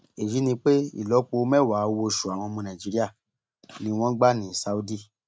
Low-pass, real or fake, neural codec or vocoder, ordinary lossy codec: none; real; none; none